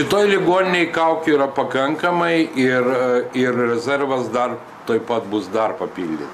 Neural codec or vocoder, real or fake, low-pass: none; real; 14.4 kHz